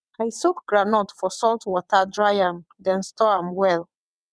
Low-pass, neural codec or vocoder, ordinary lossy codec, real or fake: none; vocoder, 22.05 kHz, 80 mel bands, WaveNeXt; none; fake